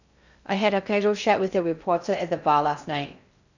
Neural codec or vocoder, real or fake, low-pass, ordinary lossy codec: codec, 16 kHz in and 24 kHz out, 0.6 kbps, FocalCodec, streaming, 2048 codes; fake; 7.2 kHz; none